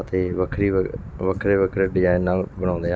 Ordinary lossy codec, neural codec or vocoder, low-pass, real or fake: none; none; none; real